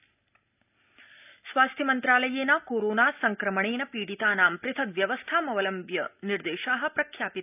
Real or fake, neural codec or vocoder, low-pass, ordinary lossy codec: real; none; 3.6 kHz; none